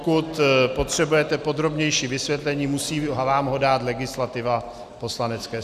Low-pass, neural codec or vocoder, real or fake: 14.4 kHz; none; real